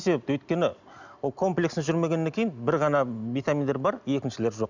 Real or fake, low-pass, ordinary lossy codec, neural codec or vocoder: real; 7.2 kHz; none; none